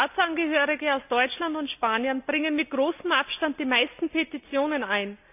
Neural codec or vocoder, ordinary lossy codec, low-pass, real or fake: none; none; 3.6 kHz; real